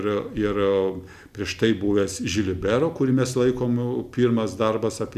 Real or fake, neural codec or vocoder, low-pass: real; none; 14.4 kHz